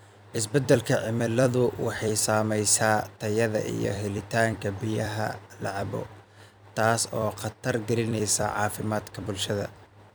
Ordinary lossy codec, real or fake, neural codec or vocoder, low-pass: none; fake; vocoder, 44.1 kHz, 128 mel bands every 256 samples, BigVGAN v2; none